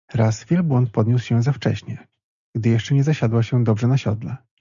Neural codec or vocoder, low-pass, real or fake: none; 7.2 kHz; real